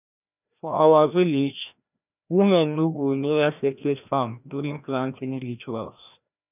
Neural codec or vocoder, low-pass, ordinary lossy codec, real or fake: codec, 16 kHz, 1 kbps, FreqCodec, larger model; 3.6 kHz; none; fake